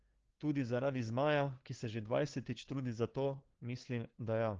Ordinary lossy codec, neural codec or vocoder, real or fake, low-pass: Opus, 16 kbps; codec, 16 kHz, 2 kbps, FunCodec, trained on LibriTTS, 25 frames a second; fake; 7.2 kHz